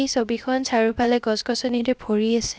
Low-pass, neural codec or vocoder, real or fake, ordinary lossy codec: none; codec, 16 kHz, 0.7 kbps, FocalCodec; fake; none